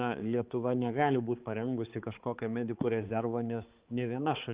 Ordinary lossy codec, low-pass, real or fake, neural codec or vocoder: Opus, 24 kbps; 3.6 kHz; fake; codec, 16 kHz, 4 kbps, X-Codec, HuBERT features, trained on balanced general audio